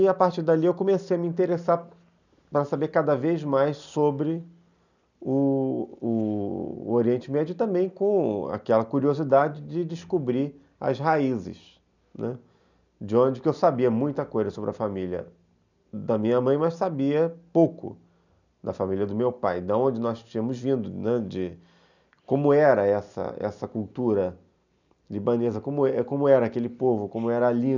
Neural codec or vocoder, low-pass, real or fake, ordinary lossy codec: none; 7.2 kHz; real; none